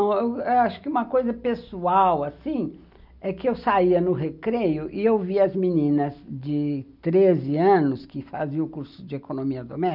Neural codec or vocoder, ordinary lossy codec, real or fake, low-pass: none; none; real; 5.4 kHz